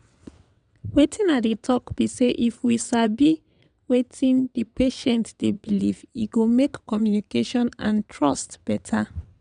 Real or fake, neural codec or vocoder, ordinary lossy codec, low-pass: fake; vocoder, 22.05 kHz, 80 mel bands, WaveNeXt; none; 9.9 kHz